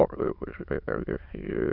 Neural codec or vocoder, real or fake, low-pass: autoencoder, 22.05 kHz, a latent of 192 numbers a frame, VITS, trained on many speakers; fake; 5.4 kHz